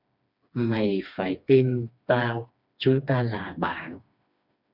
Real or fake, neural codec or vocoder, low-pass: fake; codec, 16 kHz, 2 kbps, FreqCodec, smaller model; 5.4 kHz